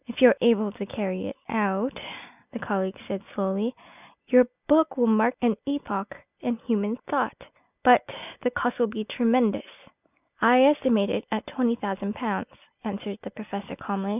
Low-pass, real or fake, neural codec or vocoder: 3.6 kHz; real; none